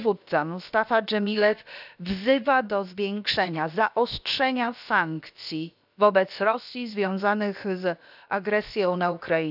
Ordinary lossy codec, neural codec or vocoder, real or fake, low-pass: none; codec, 16 kHz, about 1 kbps, DyCAST, with the encoder's durations; fake; 5.4 kHz